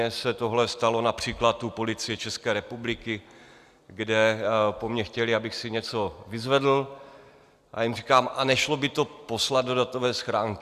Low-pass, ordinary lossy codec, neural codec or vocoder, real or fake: 14.4 kHz; Opus, 64 kbps; none; real